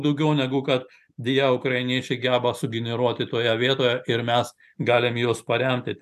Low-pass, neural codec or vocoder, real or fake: 14.4 kHz; none; real